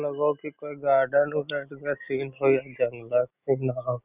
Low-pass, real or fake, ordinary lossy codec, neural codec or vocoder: 3.6 kHz; real; none; none